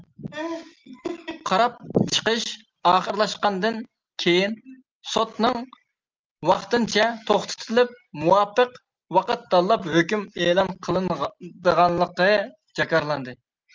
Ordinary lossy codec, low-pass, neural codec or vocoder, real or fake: Opus, 24 kbps; 7.2 kHz; none; real